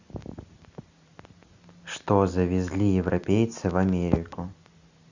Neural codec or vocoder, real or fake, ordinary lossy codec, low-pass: none; real; Opus, 64 kbps; 7.2 kHz